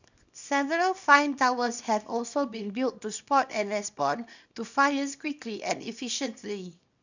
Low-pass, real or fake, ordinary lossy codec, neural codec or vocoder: 7.2 kHz; fake; none; codec, 24 kHz, 0.9 kbps, WavTokenizer, small release